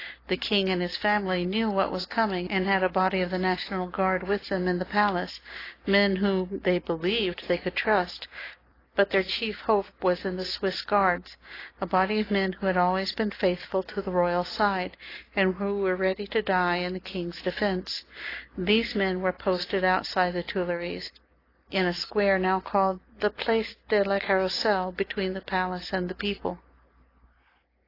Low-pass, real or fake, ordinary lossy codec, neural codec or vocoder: 5.4 kHz; real; AAC, 24 kbps; none